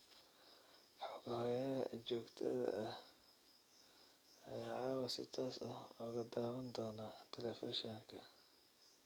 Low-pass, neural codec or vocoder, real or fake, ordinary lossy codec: none; codec, 44.1 kHz, 7.8 kbps, DAC; fake; none